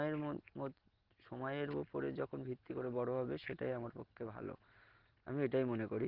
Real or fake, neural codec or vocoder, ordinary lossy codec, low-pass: real; none; Opus, 16 kbps; 5.4 kHz